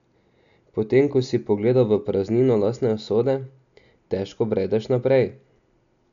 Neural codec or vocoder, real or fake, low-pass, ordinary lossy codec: none; real; 7.2 kHz; none